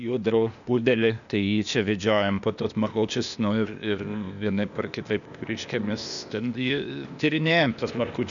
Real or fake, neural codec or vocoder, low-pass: fake; codec, 16 kHz, 0.8 kbps, ZipCodec; 7.2 kHz